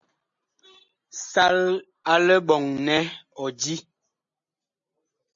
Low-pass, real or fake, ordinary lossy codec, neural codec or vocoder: 7.2 kHz; real; MP3, 48 kbps; none